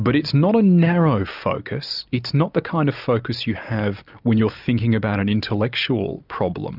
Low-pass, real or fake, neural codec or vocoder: 5.4 kHz; real; none